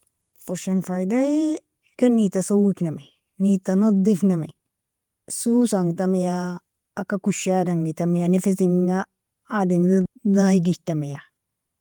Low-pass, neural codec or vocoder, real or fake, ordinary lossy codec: 19.8 kHz; vocoder, 48 kHz, 128 mel bands, Vocos; fake; Opus, 32 kbps